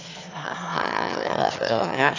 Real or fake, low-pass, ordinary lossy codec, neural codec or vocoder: fake; 7.2 kHz; AAC, 48 kbps; autoencoder, 22.05 kHz, a latent of 192 numbers a frame, VITS, trained on one speaker